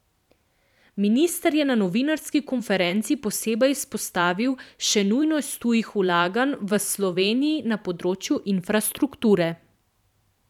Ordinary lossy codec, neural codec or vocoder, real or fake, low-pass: none; vocoder, 44.1 kHz, 128 mel bands every 512 samples, BigVGAN v2; fake; 19.8 kHz